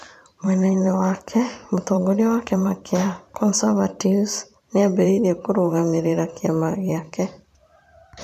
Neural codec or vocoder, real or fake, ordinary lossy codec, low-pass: vocoder, 44.1 kHz, 128 mel bands every 512 samples, BigVGAN v2; fake; none; 14.4 kHz